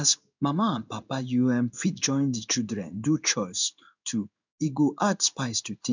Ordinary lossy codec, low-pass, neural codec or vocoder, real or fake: none; 7.2 kHz; codec, 16 kHz in and 24 kHz out, 1 kbps, XY-Tokenizer; fake